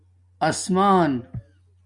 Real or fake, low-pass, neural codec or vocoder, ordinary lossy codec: real; 10.8 kHz; none; MP3, 96 kbps